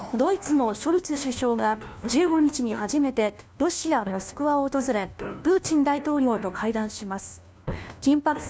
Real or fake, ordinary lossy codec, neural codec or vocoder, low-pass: fake; none; codec, 16 kHz, 1 kbps, FunCodec, trained on LibriTTS, 50 frames a second; none